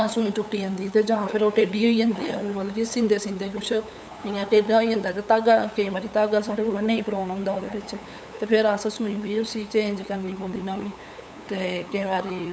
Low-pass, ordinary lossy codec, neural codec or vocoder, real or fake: none; none; codec, 16 kHz, 8 kbps, FunCodec, trained on LibriTTS, 25 frames a second; fake